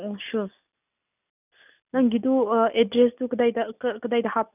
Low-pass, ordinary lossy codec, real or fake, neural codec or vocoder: 3.6 kHz; none; real; none